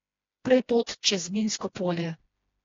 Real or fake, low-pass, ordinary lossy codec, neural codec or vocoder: fake; 7.2 kHz; AAC, 32 kbps; codec, 16 kHz, 1 kbps, FreqCodec, smaller model